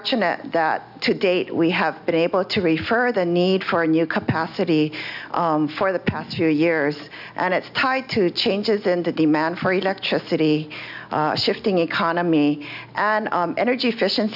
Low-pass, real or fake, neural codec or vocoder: 5.4 kHz; real; none